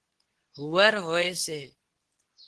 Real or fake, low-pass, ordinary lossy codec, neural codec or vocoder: fake; 10.8 kHz; Opus, 16 kbps; codec, 24 kHz, 0.9 kbps, WavTokenizer, medium speech release version 2